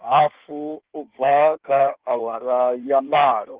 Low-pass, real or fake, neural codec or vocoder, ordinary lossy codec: 3.6 kHz; fake; codec, 16 kHz in and 24 kHz out, 1.1 kbps, FireRedTTS-2 codec; Opus, 16 kbps